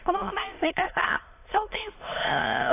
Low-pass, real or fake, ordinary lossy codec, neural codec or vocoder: 3.6 kHz; fake; AAC, 24 kbps; autoencoder, 22.05 kHz, a latent of 192 numbers a frame, VITS, trained on many speakers